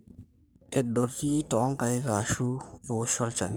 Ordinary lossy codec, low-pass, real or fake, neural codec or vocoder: none; none; fake; codec, 44.1 kHz, 3.4 kbps, Pupu-Codec